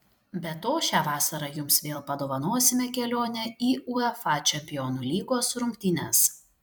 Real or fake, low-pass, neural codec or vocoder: fake; 19.8 kHz; vocoder, 44.1 kHz, 128 mel bands every 256 samples, BigVGAN v2